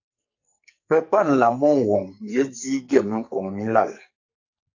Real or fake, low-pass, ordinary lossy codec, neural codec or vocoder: fake; 7.2 kHz; AAC, 48 kbps; codec, 44.1 kHz, 2.6 kbps, SNAC